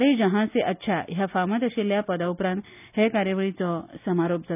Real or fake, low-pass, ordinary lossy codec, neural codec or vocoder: real; 3.6 kHz; none; none